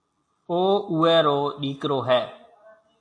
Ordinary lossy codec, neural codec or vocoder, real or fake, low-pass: AAC, 48 kbps; none; real; 9.9 kHz